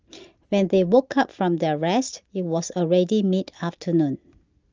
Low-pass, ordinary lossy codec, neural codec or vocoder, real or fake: 7.2 kHz; Opus, 24 kbps; none; real